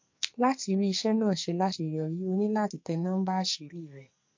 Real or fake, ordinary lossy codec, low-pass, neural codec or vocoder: fake; MP3, 48 kbps; 7.2 kHz; codec, 44.1 kHz, 2.6 kbps, SNAC